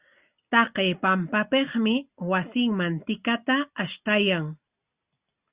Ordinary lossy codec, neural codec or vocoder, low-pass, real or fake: Opus, 64 kbps; none; 3.6 kHz; real